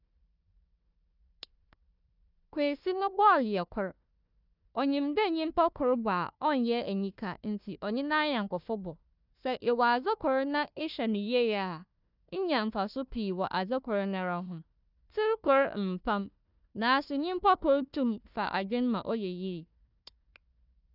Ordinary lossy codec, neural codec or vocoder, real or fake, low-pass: none; codec, 16 kHz, 1 kbps, FunCodec, trained on Chinese and English, 50 frames a second; fake; 5.4 kHz